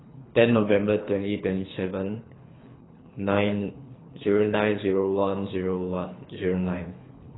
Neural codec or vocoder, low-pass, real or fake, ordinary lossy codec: codec, 24 kHz, 3 kbps, HILCodec; 7.2 kHz; fake; AAC, 16 kbps